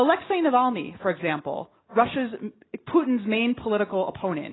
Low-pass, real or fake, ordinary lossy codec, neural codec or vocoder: 7.2 kHz; real; AAC, 16 kbps; none